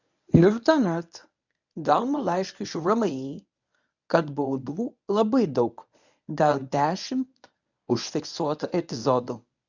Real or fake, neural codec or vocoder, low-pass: fake; codec, 24 kHz, 0.9 kbps, WavTokenizer, medium speech release version 1; 7.2 kHz